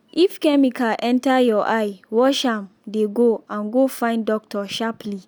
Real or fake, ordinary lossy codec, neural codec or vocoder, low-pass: real; none; none; none